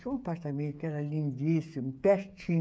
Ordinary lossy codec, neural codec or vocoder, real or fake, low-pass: none; codec, 16 kHz, 8 kbps, FreqCodec, smaller model; fake; none